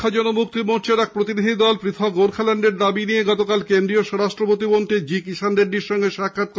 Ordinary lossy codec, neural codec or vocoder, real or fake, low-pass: none; none; real; none